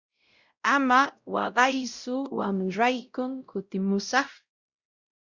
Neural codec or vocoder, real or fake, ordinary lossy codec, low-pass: codec, 16 kHz, 0.5 kbps, X-Codec, WavLM features, trained on Multilingual LibriSpeech; fake; Opus, 64 kbps; 7.2 kHz